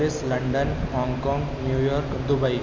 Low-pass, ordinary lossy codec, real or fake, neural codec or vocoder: none; none; real; none